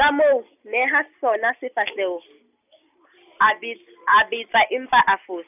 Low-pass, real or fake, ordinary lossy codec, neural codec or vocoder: 3.6 kHz; real; none; none